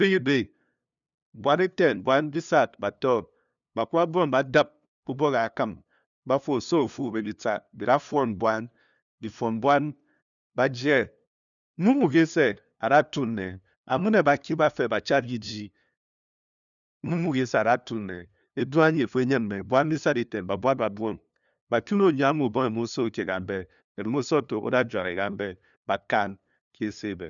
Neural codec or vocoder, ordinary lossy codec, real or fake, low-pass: codec, 16 kHz, 2 kbps, FunCodec, trained on LibriTTS, 25 frames a second; none; fake; 7.2 kHz